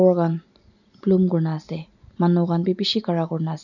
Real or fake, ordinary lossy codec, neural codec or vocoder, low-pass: real; none; none; 7.2 kHz